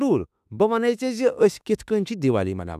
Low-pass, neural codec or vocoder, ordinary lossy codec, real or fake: 14.4 kHz; autoencoder, 48 kHz, 32 numbers a frame, DAC-VAE, trained on Japanese speech; none; fake